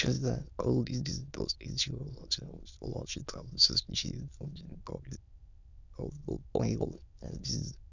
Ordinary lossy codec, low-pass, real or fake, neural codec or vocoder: none; 7.2 kHz; fake; autoencoder, 22.05 kHz, a latent of 192 numbers a frame, VITS, trained on many speakers